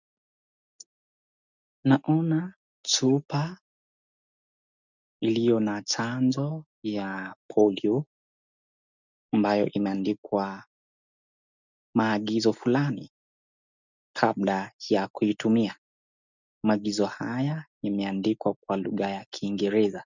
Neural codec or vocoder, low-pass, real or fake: none; 7.2 kHz; real